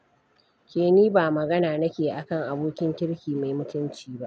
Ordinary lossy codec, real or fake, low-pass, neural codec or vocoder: none; real; none; none